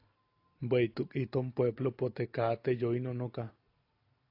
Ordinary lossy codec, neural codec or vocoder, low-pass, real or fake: AAC, 32 kbps; none; 5.4 kHz; real